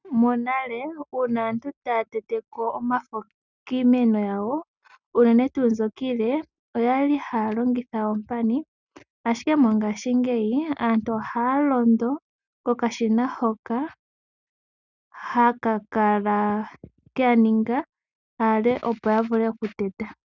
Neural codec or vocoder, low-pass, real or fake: none; 7.2 kHz; real